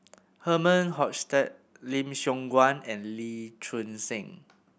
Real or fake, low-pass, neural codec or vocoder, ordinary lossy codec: real; none; none; none